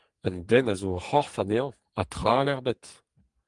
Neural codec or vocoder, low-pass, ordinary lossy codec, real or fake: codec, 44.1 kHz, 2.6 kbps, SNAC; 10.8 kHz; Opus, 24 kbps; fake